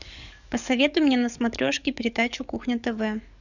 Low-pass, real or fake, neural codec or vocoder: 7.2 kHz; fake; codec, 44.1 kHz, 7.8 kbps, DAC